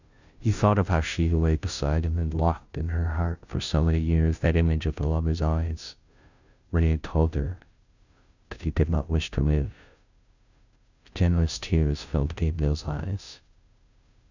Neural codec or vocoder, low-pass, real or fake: codec, 16 kHz, 0.5 kbps, FunCodec, trained on Chinese and English, 25 frames a second; 7.2 kHz; fake